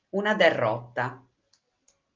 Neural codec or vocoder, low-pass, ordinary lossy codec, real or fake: none; 7.2 kHz; Opus, 32 kbps; real